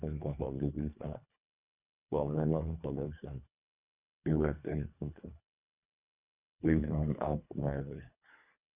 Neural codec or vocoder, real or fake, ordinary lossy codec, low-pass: codec, 24 kHz, 1.5 kbps, HILCodec; fake; Opus, 64 kbps; 3.6 kHz